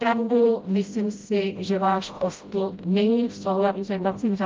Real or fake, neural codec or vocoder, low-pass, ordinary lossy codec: fake; codec, 16 kHz, 0.5 kbps, FreqCodec, smaller model; 7.2 kHz; Opus, 24 kbps